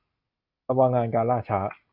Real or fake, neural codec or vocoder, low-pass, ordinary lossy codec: real; none; 5.4 kHz; Opus, 64 kbps